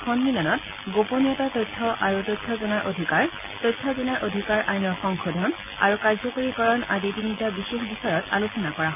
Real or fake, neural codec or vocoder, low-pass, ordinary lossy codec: real; none; 3.6 kHz; none